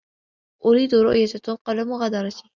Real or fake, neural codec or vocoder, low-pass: real; none; 7.2 kHz